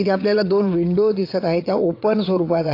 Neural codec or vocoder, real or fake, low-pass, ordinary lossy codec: vocoder, 22.05 kHz, 80 mel bands, WaveNeXt; fake; 5.4 kHz; AAC, 32 kbps